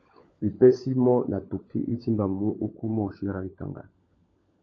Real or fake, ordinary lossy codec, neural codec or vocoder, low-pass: fake; AAC, 32 kbps; codec, 16 kHz, 16 kbps, FunCodec, trained on LibriTTS, 50 frames a second; 7.2 kHz